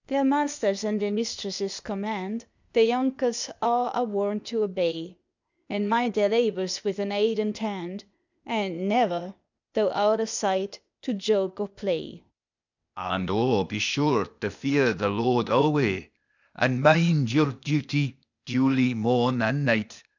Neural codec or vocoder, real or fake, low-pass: codec, 16 kHz, 0.8 kbps, ZipCodec; fake; 7.2 kHz